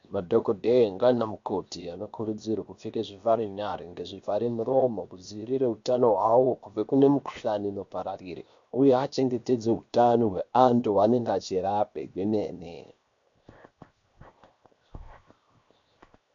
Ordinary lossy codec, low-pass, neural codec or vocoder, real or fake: MP3, 64 kbps; 7.2 kHz; codec, 16 kHz, 0.7 kbps, FocalCodec; fake